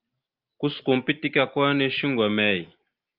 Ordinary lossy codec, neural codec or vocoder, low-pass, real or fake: Opus, 24 kbps; none; 5.4 kHz; real